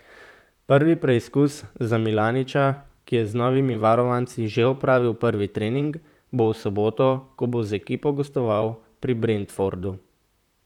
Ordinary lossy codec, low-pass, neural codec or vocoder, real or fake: none; 19.8 kHz; vocoder, 44.1 kHz, 128 mel bands, Pupu-Vocoder; fake